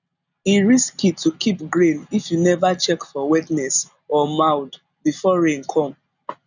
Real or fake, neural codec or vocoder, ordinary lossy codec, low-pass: real; none; none; 7.2 kHz